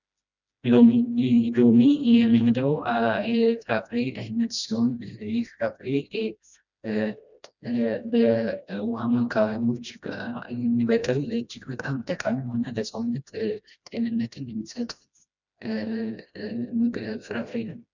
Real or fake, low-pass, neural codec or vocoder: fake; 7.2 kHz; codec, 16 kHz, 1 kbps, FreqCodec, smaller model